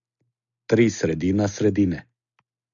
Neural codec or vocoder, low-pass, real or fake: none; 7.2 kHz; real